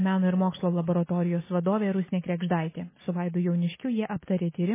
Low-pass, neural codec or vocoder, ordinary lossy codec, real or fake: 3.6 kHz; none; MP3, 16 kbps; real